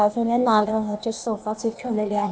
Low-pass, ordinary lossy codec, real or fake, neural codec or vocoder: none; none; fake; codec, 16 kHz, 0.8 kbps, ZipCodec